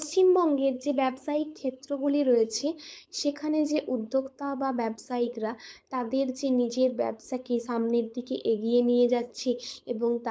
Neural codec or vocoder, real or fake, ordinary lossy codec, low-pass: codec, 16 kHz, 4.8 kbps, FACodec; fake; none; none